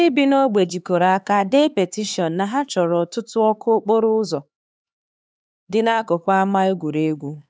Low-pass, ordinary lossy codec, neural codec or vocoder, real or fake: none; none; codec, 16 kHz, 4 kbps, X-Codec, HuBERT features, trained on LibriSpeech; fake